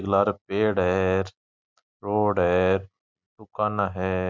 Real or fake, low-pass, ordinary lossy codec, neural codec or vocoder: real; 7.2 kHz; MP3, 64 kbps; none